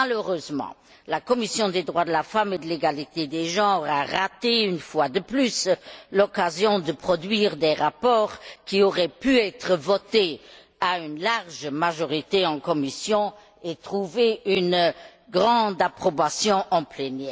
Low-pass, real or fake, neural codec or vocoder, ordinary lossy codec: none; real; none; none